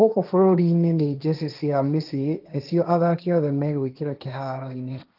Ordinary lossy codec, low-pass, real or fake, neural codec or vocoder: Opus, 32 kbps; 5.4 kHz; fake; codec, 16 kHz, 1.1 kbps, Voila-Tokenizer